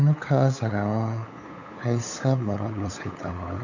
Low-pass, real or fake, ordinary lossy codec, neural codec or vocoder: 7.2 kHz; fake; none; codec, 16 kHz, 8 kbps, FunCodec, trained on LibriTTS, 25 frames a second